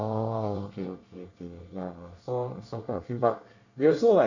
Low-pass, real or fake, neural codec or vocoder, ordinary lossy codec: 7.2 kHz; fake; codec, 24 kHz, 1 kbps, SNAC; none